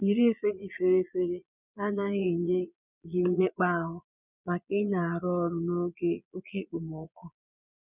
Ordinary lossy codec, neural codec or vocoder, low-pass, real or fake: none; vocoder, 22.05 kHz, 80 mel bands, Vocos; 3.6 kHz; fake